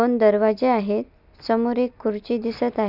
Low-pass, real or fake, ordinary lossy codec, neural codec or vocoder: 5.4 kHz; real; none; none